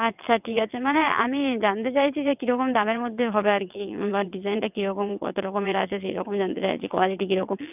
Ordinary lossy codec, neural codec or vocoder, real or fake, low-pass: none; vocoder, 22.05 kHz, 80 mel bands, WaveNeXt; fake; 3.6 kHz